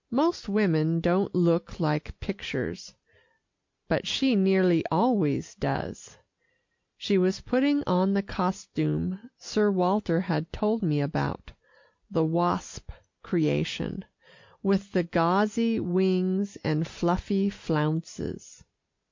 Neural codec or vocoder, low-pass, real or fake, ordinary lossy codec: none; 7.2 kHz; real; MP3, 48 kbps